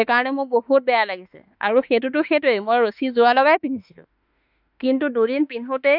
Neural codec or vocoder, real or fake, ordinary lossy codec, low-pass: autoencoder, 48 kHz, 32 numbers a frame, DAC-VAE, trained on Japanese speech; fake; none; 5.4 kHz